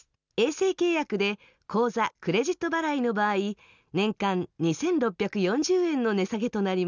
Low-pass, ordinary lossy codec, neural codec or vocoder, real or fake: 7.2 kHz; none; none; real